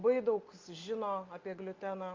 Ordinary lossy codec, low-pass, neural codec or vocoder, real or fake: Opus, 24 kbps; 7.2 kHz; none; real